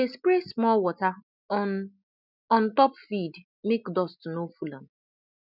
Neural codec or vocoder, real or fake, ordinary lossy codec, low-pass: none; real; none; 5.4 kHz